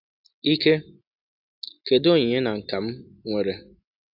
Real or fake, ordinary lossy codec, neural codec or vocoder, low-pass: real; AAC, 48 kbps; none; 5.4 kHz